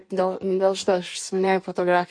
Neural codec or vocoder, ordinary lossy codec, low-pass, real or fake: codec, 16 kHz in and 24 kHz out, 1.1 kbps, FireRedTTS-2 codec; MP3, 48 kbps; 9.9 kHz; fake